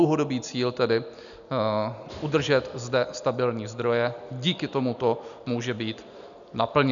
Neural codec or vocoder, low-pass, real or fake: none; 7.2 kHz; real